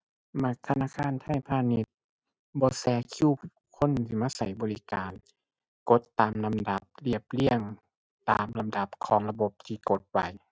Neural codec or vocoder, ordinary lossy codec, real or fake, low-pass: none; none; real; none